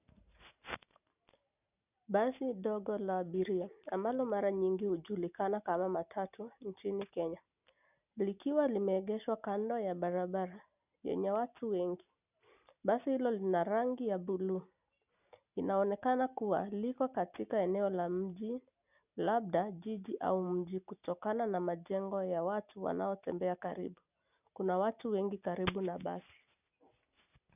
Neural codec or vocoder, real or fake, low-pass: none; real; 3.6 kHz